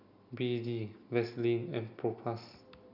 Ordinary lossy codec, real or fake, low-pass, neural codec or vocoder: none; real; 5.4 kHz; none